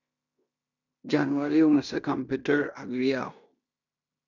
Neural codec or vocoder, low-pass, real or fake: codec, 16 kHz in and 24 kHz out, 0.9 kbps, LongCat-Audio-Codec, fine tuned four codebook decoder; 7.2 kHz; fake